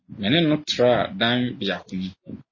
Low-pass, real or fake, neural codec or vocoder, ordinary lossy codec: 7.2 kHz; real; none; MP3, 32 kbps